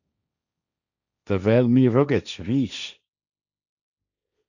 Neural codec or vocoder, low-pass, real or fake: codec, 16 kHz, 1.1 kbps, Voila-Tokenizer; 7.2 kHz; fake